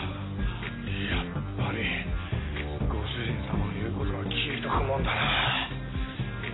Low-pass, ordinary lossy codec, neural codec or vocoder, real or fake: 7.2 kHz; AAC, 16 kbps; none; real